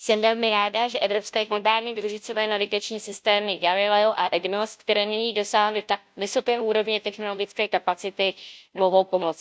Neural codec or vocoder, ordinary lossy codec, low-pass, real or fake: codec, 16 kHz, 0.5 kbps, FunCodec, trained on Chinese and English, 25 frames a second; none; none; fake